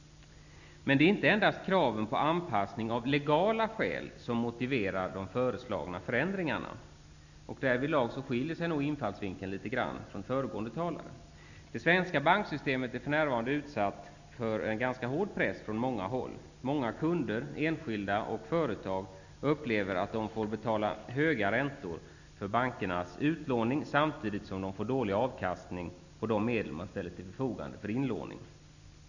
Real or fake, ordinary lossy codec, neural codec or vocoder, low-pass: real; none; none; 7.2 kHz